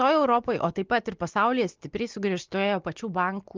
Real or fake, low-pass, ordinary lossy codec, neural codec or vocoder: real; 7.2 kHz; Opus, 32 kbps; none